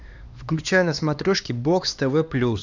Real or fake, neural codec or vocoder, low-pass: fake; codec, 16 kHz, 2 kbps, X-Codec, HuBERT features, trained on LibriSpeech; 7.2 kHz